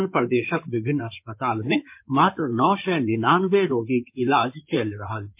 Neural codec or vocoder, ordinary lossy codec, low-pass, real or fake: codec, 16 kHz in and 24 kHz out, 2.2 kbps, FireRedTTS-2 codec; MP3, 32 kbps; 3.6 kHz; fake